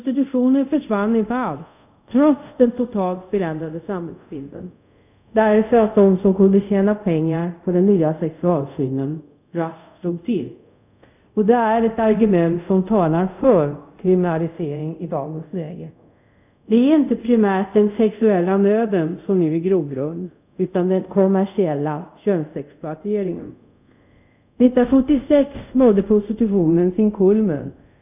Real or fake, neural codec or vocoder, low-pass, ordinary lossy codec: fake; codec, 24 kHz, 0.5 kbps, DualCodec; 3.6 kHz; none